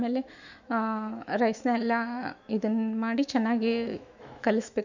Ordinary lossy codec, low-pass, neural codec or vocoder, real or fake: none; 7.2 kHz; autoencoder, 48 kHz, 128 numbers a frame, DAC-VAE, trained on Japanese speech; fake